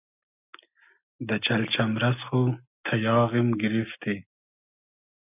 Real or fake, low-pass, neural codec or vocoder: real; 3.6 kHz; none